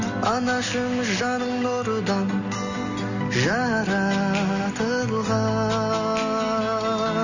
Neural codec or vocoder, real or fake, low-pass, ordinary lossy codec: none; real; 7.2 kHz; none